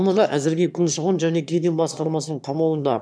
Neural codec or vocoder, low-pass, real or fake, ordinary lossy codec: autoencoder, 22.05 kHz, a latent of 192 numbers a frame, VITS, trained on one speaker; none; fake; none